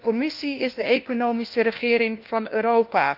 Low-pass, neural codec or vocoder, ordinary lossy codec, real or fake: 5.4 kHz; codec, 16 kHz, 1 kbps, FunCodec, trained on LibriTTS, 50 frames a second; Opus, 24 kbps; fake